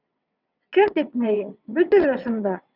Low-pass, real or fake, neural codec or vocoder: 5.4 kHz; fake; vocoder, 22.05 kHz, 80 mel bands, WaveNeXt